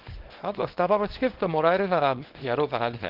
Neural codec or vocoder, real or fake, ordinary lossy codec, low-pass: codec, 24 kHz, 0.9 kbps, WavTokenizer, small release; fake; Opus, 16 kbps; 5.4 kHz